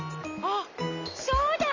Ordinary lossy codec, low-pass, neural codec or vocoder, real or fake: none; 7.2 kHz; none; real